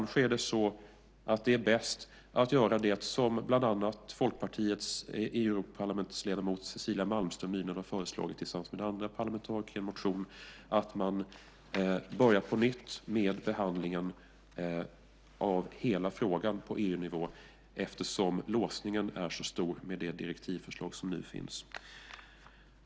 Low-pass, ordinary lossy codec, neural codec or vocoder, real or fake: none; none; none; real